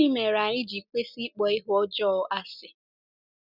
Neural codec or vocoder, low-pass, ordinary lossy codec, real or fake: none; 5.4 kHz; none; real